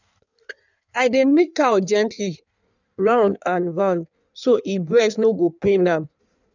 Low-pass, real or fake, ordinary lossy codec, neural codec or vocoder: 7.2 kHz; fake; none; codec, 16 kHz in and 24 kHz out, 1.1 kbps, FireRedTTS-2 codec